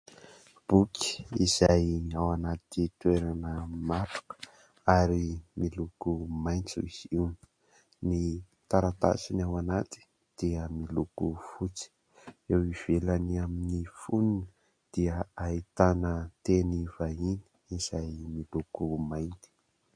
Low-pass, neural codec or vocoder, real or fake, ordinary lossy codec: 9.9 kHz; none; real; MP3, 48 kbps